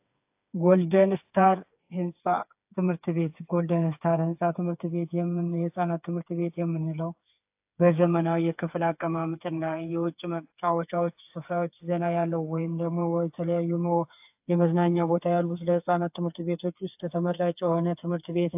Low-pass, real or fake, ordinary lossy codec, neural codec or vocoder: 3.6 kHz; fake; AAC, 32 kbps; codec, 16 kHz, 4 kbps, FreqCodec, smaller model